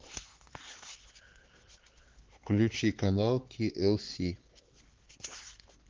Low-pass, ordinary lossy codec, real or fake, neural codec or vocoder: 7.2 kHz; Opus, 16 kbps; fake; codec, 16 kHz, 4 kbps, X-Codec, HuBERT features, trained on LibriSpeech